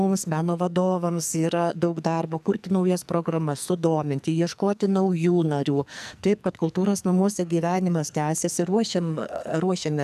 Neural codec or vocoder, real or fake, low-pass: codec, 44.1 kHz, 2.6 kbps, SNAC; fake; 14.4 kHz